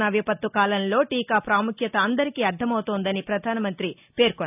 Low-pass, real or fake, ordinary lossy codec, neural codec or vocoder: 3.6 kHz; real; none; none